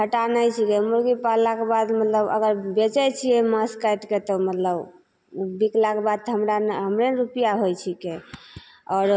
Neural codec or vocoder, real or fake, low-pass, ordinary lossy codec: none; real; none; none